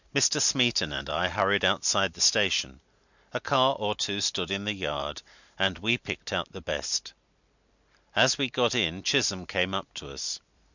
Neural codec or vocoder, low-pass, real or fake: none; 7.2 kHz; real